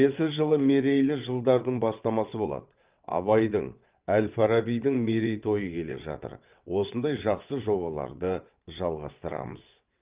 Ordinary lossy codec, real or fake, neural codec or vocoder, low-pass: Opus, 32 kbps; fake; vocoder, 22.05 kHz, 80 mel bands, WaveNeXt; 3.6 kHz